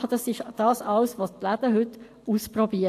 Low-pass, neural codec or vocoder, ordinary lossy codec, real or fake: 14.4 kHz; vocoder, 44.1 kHz, 128 mel bands every 512 samples, BigVGAN v2; AAC, 64 kbps; fake